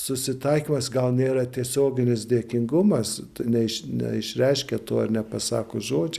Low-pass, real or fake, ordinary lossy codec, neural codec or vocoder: 14.4 kHz; real; Opus, 64 kbps; none